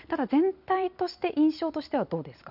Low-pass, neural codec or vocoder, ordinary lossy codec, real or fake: 5.4 kHz; none; none; real